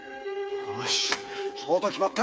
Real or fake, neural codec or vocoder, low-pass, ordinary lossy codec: fake; codec, 16 kHz, 8 kbps, FreqCodec, smaller model; none; none